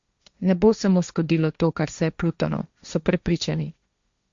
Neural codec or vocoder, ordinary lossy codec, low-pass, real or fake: codec, 16 kHz, 1.1 kbps, Voila-Tokenizer; Opus, 64 kbps; 7.2 kHz; fake